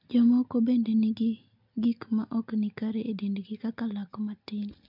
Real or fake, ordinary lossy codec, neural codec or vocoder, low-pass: real; MP3, 48 kbps; none; 5.4 kHz